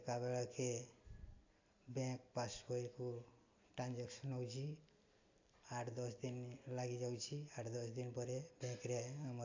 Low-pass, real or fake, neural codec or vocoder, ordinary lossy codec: 7.2 kHz; real; none; AAC, 48 kbps